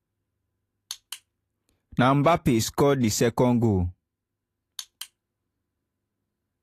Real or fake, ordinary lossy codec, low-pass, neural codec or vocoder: real; AAC, 48 kbps; 14.4 kHz; none